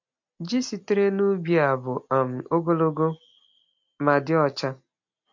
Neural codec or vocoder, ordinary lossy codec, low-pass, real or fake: none; MP3, 48 kbps; 7.2 kHz; real